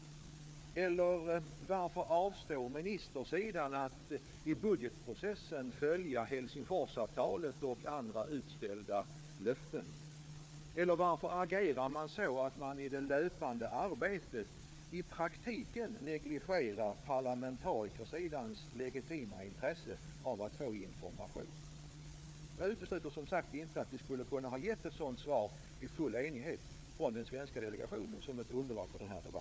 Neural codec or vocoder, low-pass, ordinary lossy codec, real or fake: codec, 16 kHz, 4 kbps, FreqCodec, larger model; none; none; fake